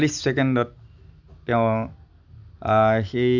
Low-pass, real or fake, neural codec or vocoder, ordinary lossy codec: 7.2 kHz; real; none; none